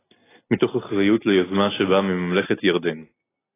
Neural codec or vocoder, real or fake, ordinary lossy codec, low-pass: none; real; AAC, 16 kbps; 3.6 kHz